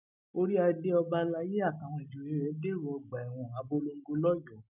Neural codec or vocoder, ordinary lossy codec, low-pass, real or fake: none; none; 3.6 kHz; real